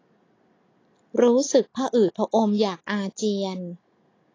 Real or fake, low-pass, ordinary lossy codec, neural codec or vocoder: real; 7.2 kHz; AAC, 32 kbps; none